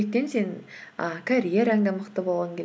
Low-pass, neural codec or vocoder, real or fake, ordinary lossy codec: none; none; real; none